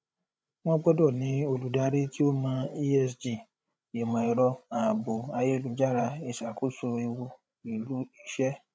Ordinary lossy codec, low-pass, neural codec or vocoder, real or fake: none; none; codec, 16 kHz, 16 kbps, FreqCodec, larger model; fake